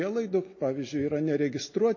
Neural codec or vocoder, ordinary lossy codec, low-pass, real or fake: none; MP3, 32 kbps; 7.2 kHz; real